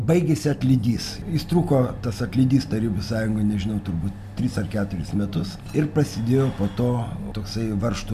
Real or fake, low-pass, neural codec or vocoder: real; 14.4 kHz; none